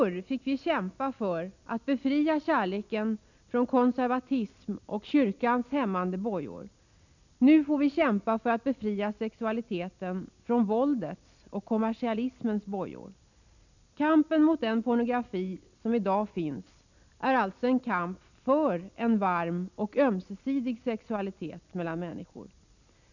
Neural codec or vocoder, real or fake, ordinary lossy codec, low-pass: none; real; none; 7.2 kHz